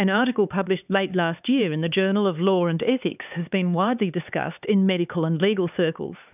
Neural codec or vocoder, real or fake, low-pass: codec, 16 kHz, 4 kbps, X-Codec, HuBERT features, trained on LibriSpeech; fake; 3.6 kHz